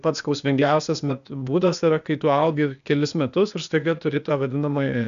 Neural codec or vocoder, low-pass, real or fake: codec, 16 kHz, 0.8 kbps, ZipCodec; 7.2 kHz; fake